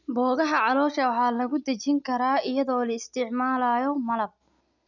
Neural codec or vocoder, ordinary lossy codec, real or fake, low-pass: none; none; real; 7.2 kHz